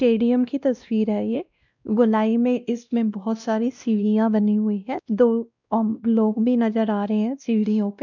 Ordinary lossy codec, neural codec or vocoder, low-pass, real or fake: none; codec, 16 kHz, 1 kbps, X-Codec, WavLM features, trained on Multilingual LibriSpeech; 7.2 kHz; fake